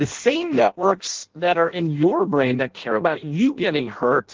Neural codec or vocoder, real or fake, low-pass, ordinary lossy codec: codec, 16 kHz in and 24 kHz out, 0.6 kbps, FireRedTTS-2 codec; fake; 7.2 kHz; Opus, 16 kbps